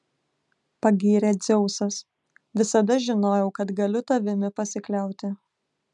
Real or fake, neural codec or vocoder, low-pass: real; none; 10.8 kHz